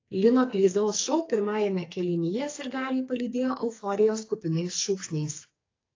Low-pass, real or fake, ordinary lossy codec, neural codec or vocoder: 7.2 kHz; fake; AAC, 32 kbps; codec, 44.1 kHz, 2.6 kbps, SNAC